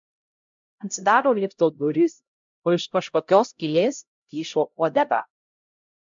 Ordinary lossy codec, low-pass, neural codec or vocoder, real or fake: MP3, 64 kbps; 7.2 kHz; codec, 16 kHz, 0.5 kbps, X-Codec, HuBERT features, trained on LibriSpeech; fake